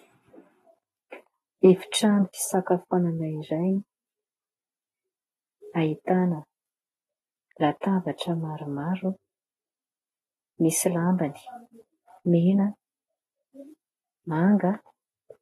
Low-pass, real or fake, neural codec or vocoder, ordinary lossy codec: 14.4 kHz; real; none; AAC, 32 kbps